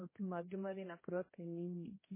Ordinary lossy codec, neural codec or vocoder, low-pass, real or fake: MP3, 16 kbps; codec, 16 kHz, 1 kbps, X-Codec, HuBERT features, trained on general audio; 3.6 kHz; fake